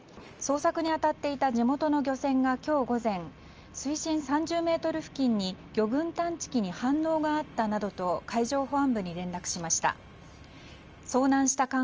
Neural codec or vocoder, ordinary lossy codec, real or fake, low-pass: none; Opus, 24 kbps; real; 7.2 kHz